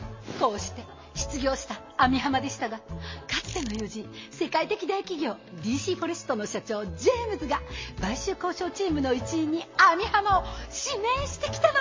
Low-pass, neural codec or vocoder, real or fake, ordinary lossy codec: 7.2 kHz; none; real; MP3, 32 kbps